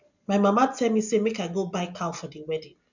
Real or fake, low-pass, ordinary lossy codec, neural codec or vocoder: real; 7.2 kHz; none; none